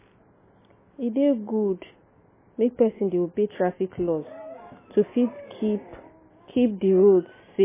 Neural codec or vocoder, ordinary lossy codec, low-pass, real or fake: none; MP3, 16 kbps; 3.6 kHz; real